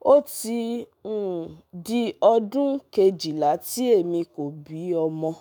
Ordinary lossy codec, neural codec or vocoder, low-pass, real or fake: none; autoencoder, 48 kHz, 128 numbers a frame, DAC-VAE, trained on Japanese speech; none; fake